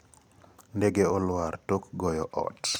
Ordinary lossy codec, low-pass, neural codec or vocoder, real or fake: none; none; none; real